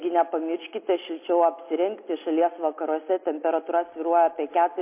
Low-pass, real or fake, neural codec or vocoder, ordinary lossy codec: 3.6 kHz; real; none; MP3, 24 kbps